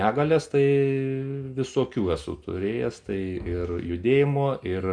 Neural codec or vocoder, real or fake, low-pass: none; real; 9.9 kHz